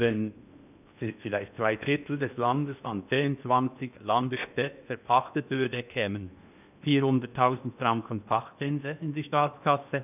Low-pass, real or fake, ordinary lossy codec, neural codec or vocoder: 3.6 kHz; fake; none; codec, 16 kHz in and 24 kHz out, 0.8 kbps, FocalCodec, streaming, 65536 codes